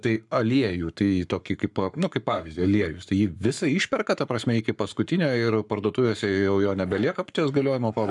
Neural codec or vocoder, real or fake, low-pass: codec, 44.1 kHz, 7.8 kbps, Pupu-Codec; fake; 10.8 kHz